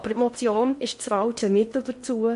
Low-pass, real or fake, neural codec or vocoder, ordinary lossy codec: 10.8 kHz; fake; codec, 16 kHz in and 24 kHz out, 0.8 kbps, FocalCodec, streaming, 65536 codes; MP3, 48 kbps